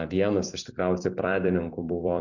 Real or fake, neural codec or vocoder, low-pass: fake; vocoder, 44.1 kHz, 128 mel bands every 256 samples, BigVGAN v2; 7.2 kHz